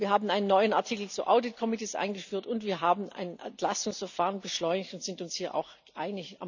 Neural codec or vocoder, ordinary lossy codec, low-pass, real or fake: none; none; 7.2 kHz; real